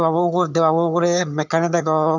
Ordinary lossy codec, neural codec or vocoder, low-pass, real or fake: none; vocoder, 22.05 kHz, 80 mel bands, HiFi-GAN; 7.2 kHz; fake